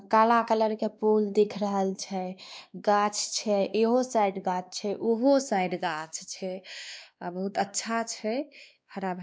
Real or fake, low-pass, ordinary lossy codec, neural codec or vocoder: fake; none; none; codec, 16 kHz, 2 kbps, X-Codec, WavLM features, trained on Multilingual LibriSpeech